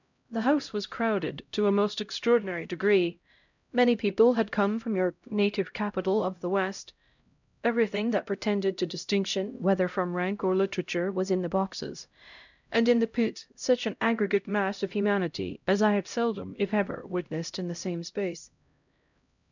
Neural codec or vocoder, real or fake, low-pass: codec, 16 kHz, 0.5 kbps, X-Codec, HuBERT features, trained on LibriSpeech; fake; 7.2 kHz